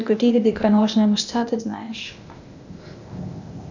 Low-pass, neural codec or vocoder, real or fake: 7.2 kHz; codec, 16 kHz, 0.8 kbps, ZipCodec; fake